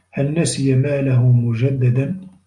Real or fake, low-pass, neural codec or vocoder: real; 10.8 kHz; none